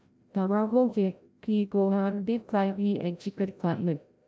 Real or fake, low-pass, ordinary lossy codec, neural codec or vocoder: fake; none; none; codec, 16 kHz, 0.5 kbps, FreqCodec, larger model